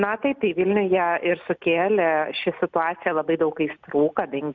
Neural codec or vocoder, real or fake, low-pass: none; real; 7.2 kHz